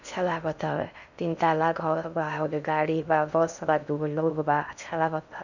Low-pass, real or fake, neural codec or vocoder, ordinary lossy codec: 7.2 kHz; fake; codec, 16 kHz in and 24 kHz out, 0.6 kbps, FocalCodec, streaming, 2048 codes; none